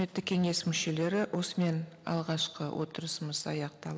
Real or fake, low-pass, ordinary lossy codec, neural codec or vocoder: real; none; none; none